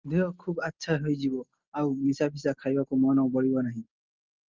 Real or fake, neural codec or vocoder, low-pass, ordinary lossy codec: real; none; 7.2 kHz; Opus, 24 kbps